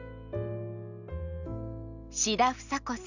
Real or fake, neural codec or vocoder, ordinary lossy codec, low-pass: real; none; none; 7.2 kHz